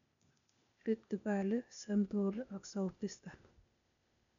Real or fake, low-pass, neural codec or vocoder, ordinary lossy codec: fake; 7.2 kHz; codec, 16 kHz, 0.8 kbps, ZipCodec; none